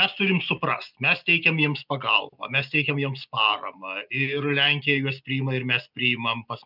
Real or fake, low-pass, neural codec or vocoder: real; 5.4 kHz; none